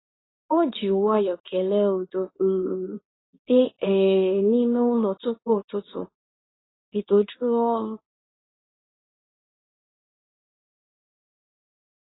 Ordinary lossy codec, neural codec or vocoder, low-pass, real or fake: AAC, 16 kbps; codec, 24 kHz, 0.9 kbps, WavTokenizer, medium speech release version 2; 7.2 kHz; fake